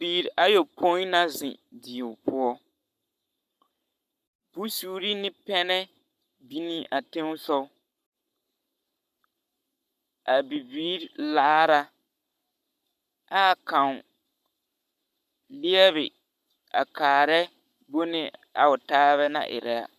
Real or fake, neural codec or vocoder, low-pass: fake; codec, 44.1 kHz, 7.8 kbps, Pupu-Codec; 14.4 kHz